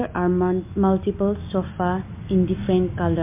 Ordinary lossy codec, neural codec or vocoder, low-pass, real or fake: MP3, 24 kbps; none; 3.6 kHz; real